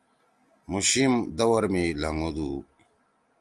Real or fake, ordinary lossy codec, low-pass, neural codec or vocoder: real; Opus, 32 kbps; 10.8 kHz; none